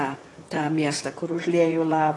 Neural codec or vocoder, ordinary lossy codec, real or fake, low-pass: vocoder, 44.1 kHz, 128 mel bands, Pupu-Vocoder; AAC, 32 kbps; fake; 10.8 kHz